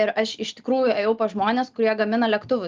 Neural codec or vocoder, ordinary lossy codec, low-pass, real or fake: none; Opus, 32 kbps; 7.2 kHz; real